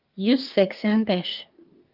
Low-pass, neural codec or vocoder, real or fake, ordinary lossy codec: 5.4 kHz; codec, 24 kHz, 1 kbps, SNAC; fake; Opus, 24 kbps